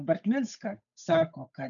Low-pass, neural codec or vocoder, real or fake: 7.2 kHz; codec, 16 kHz, 8 kbps, FunCodec, trained on Chinese and English, 25 frames a second; fake